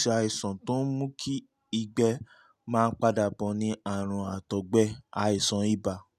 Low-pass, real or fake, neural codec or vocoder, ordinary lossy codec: 14.4 kHz; real; none; none